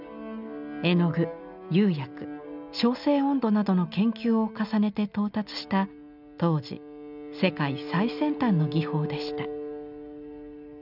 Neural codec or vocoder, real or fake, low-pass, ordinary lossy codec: none; real; 5.4 kHz; none